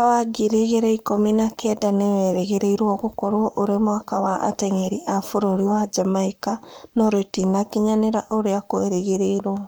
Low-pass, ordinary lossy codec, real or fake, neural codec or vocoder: none; none; fake; codec, 44.1 kHz, 7.8 kbps, Pupu-Codec